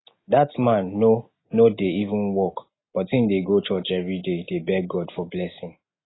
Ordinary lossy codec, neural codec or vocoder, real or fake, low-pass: AAC, 16 kbps; none; real; 7.2 kHz